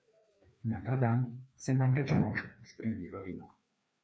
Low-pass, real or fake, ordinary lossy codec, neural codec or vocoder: none; fake; none; codec, 16 kHz, 2 kbps, FreqCodec, larger model